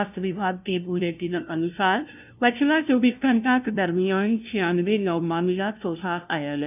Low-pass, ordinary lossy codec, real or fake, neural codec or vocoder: 3.6 kHz; none; fake; codec, 16 kHz, 0.5 kbps, FunCodec, trained on LibriTTS, 25 frames a second